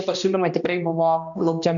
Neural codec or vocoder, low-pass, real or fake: codec, 16 kHz, 1 kbps, X-Codec, HuBERT features, trained on balanced general audio; 7.2 kHz; fake